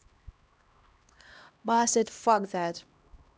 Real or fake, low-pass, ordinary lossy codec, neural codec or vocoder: fake; none; none; codec, 16 kHz, 1 kbps, X-Codec, HuBERT features, trained on LibriSpeech